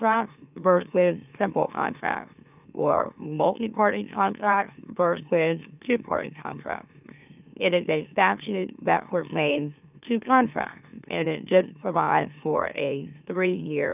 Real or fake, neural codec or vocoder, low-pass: fake; autoencoder, 44.1 kHz, a latent of 192 numbers a frame, MeloTTS; 3.6 kHz